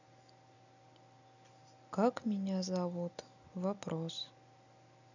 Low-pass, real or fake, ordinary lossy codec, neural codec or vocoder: 7.2 kHz; real; none; none